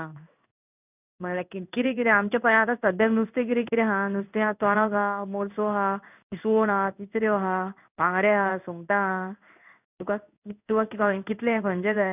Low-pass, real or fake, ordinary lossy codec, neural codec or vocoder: 3.6 kHz; fake; none; codec, 16 kHz in and 24 kHz out, 1 kbps, XY-Tokenizer